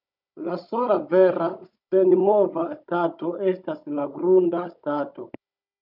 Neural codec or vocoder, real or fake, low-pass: codec, 16 kHz, 16 kbps, FunCodec, trained on Chinese and English, 50 frames a second; fake; 5.4 kHz